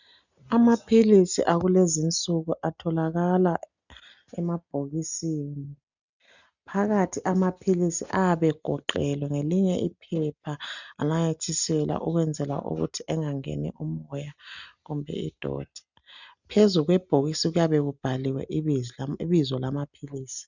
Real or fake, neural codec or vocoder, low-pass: real; none; 7.2 kHz